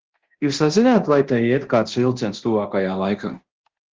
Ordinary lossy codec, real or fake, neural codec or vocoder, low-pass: Opus, 16 kbps; fake; codec, 24 kHz, 0.9 kbps, DualCodec; 7.2 kHz